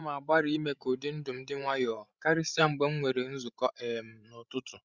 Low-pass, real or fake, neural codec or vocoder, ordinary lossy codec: 7.2 kHz; fake; codec, 16 kHz, 16 kbps, FreqCodec, larger model; Opus, 64 kbps